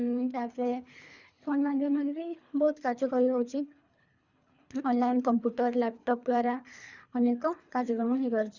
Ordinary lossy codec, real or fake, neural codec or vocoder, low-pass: Opus, 64 kbps; fake; codec, 24 kHz, 3 kbps, HILCodec; 7.2 kHz